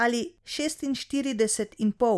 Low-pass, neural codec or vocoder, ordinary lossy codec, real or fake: none; none; none; real